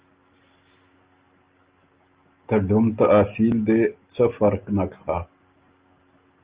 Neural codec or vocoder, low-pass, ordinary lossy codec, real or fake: none; 3.6 kHz; Opus, 32 kbps; real